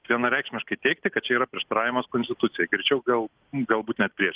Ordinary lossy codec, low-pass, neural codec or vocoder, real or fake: Opus, 16 kbps; 3.6 kHz; none; real